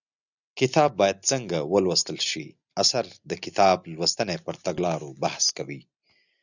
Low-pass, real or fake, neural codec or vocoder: 7.2 kHz; real; none